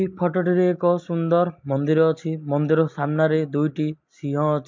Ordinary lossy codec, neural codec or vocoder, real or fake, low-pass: MP3, 48 kbps; none; real; 7.2 kHz